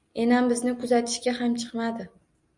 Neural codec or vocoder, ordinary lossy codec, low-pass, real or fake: none; MP3, 96 kbps; 10.8 kHz; real